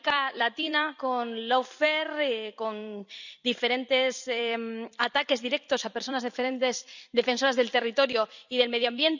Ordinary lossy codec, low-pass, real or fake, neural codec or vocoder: none; 7.2 kHz; fake; vocoder, 44.1 kHz, 128 mel bands every 256 samples, BigVGAN v2